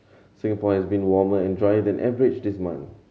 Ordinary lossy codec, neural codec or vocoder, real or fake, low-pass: none; none; real; none